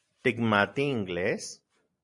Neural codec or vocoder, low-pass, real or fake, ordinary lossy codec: vocoder, 44.1 kHz, 128 mel bands every 512 samples, BigVGAN v2; 10.8 kHz; fake; MP3, 96 kbps